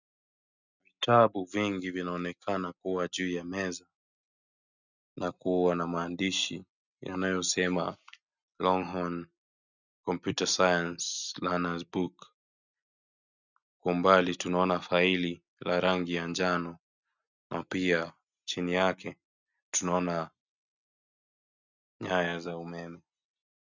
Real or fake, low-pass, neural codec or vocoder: real; 7.2 kHz; none